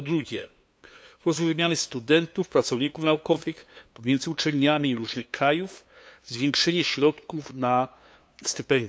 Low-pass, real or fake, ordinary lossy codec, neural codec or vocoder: none; fake; none; codec, 16 kHz, 2 kbps, FunCodec, trained on LibriTTS, 25 frames a second